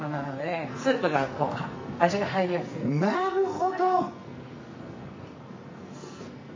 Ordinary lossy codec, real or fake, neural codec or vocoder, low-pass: MP3, 32 kbps; fake; codec, 44.1 kHz, 2.6 kbps, SNAC; 7.2 kHz